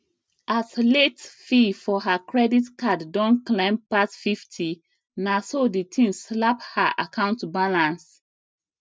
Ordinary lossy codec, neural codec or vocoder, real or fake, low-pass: none; none; real; none